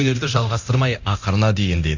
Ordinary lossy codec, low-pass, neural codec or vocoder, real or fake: none; 7.2 kHz; codec, 24 kHz, 0.9 kbps, DualCodec; fake